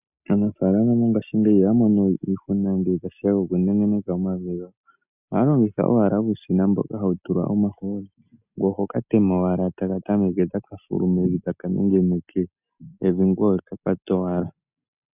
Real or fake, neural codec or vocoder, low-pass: real; none; 3.6 kHz